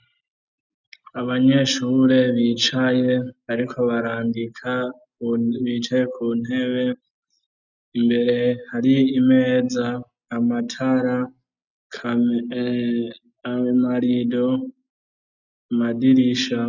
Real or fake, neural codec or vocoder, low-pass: real; none; 7.2 kHz